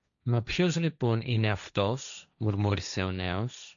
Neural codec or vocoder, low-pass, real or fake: codec, 16 kHz, 1.1 kbps, Voila-Tokenizer; 7.2 kHz; fake